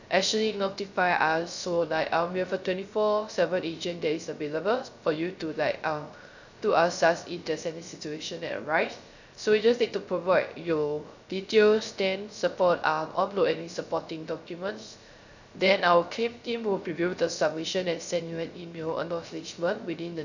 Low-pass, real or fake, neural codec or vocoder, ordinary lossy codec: 7.2 kHz; fake; codec, 16 kHz, 0.3 kbps, FocalCodec; none